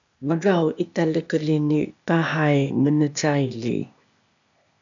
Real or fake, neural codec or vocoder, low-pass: fake; codec, 16 kHz, 0.8 kbps, ZipCodec; 7.2 kHz